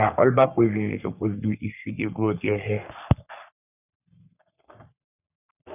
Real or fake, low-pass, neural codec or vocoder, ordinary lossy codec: fake; 3.6 kHz; codec, 44.1 kHz, 3.4 kbps, Pupu-Codec; none